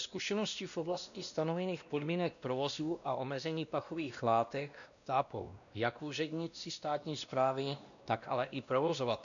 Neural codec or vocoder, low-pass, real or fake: codec, 16 kHz, 1 kbps, X-Codec, WavLM features, trained on Multilingual LibriSpeech; 7.2 kHz; fake